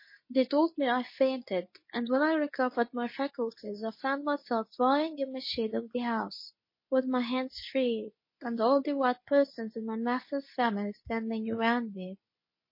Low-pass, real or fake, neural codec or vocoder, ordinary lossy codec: 5.4 kHz; fake; codec, 24 kHz, 0.9 kbps, WavTokenizer, medium speech release version 2; MP3, 24 kbps